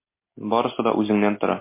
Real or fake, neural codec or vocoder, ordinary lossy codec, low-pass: real; none; MP3, 24 kbps; 3.6 kHz